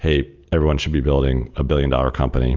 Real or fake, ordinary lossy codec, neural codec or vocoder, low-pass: real; Opus, 32 kbps; none; 7.2 kHz